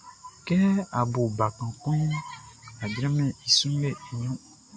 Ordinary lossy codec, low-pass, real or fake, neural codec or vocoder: AAC, 64 kbps; 9.9 kHz; real; none